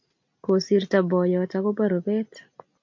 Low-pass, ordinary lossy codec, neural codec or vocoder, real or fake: 7.2 kHz; MP3, 48 kbps; none; real